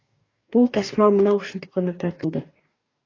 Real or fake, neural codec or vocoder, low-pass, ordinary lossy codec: fake; codec, 44.1 kHz, 2.6 kbps, DAC; 7.2 kHz; AAC, 32 kbps